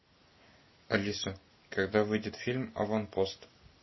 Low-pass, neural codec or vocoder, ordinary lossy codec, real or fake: 7.2 kHz; none; MP3, 24 kbps; real